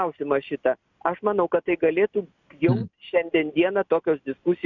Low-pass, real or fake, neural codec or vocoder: 7.2 kHz; real; none